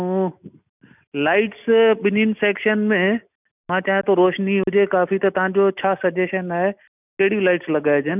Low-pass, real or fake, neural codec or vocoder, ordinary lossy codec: 3.6 kHz; real; none; none